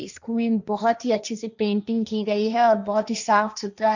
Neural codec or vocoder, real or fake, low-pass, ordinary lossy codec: codec, 16 kHz, 1.1 kbps, Voila-Tokenizer; fake; 7.2 kHz; none